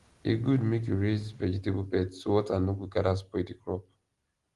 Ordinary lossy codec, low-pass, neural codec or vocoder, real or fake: Opus, 24 kbps; 10.8 kHz; none; real